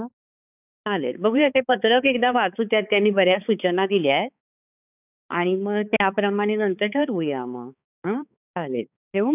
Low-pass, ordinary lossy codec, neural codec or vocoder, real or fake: 3.6 kHz; none; codec, 16 kHz, 4 kbps, X-Codec, HuBERT features, trained on balanced general audio; fake